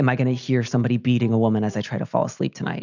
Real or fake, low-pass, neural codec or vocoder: real; 7.2 kHz; none